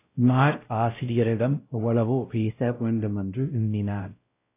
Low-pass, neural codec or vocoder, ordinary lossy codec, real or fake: 3.6 kHz; codec, 16 kHz, 0.5 kbps, X-Codec, WavLM features, trained on Multilingual LibriSpeech; AAC, 24 kbps; fake